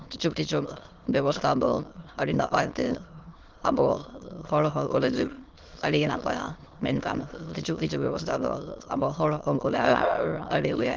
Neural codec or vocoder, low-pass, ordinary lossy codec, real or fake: autoencoder, 22.05 kHz, a latent of 192 numbers a frame, VITS, trained on many speakers; 7.2 kHz; Opus, 16 kbps; fake